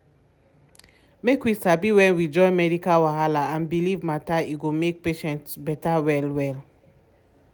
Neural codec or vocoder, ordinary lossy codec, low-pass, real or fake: none; none; none; real